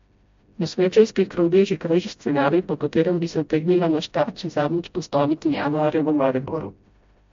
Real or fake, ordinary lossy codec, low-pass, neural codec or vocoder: fake; MP3, 48 kbps; 7.2 kHz; codec, 16 kHz, 0.5 kbps, FreqCodec, smaller model